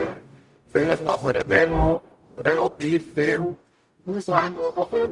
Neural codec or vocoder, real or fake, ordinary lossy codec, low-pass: codec, 44.1 kHz, 0.9 kbps, DAC; fake; Opus, 64 kbps; 10.8 kHz